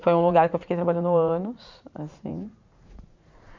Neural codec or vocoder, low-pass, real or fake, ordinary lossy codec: vocoder, 44.1 kHz, 80 mel bands, Vocos; 7.2 kHz; fake; MP3, 64 kbps